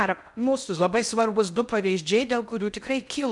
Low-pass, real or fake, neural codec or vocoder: 10.8 kHz; fake; codec, 16 kHz in and 24 kHz out, 0.6 kbps, FocalCodec, streaming, 2048 codes